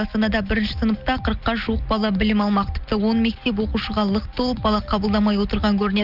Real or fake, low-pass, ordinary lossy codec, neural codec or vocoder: real; 5.4 kHz; Opus, 32 kbps; none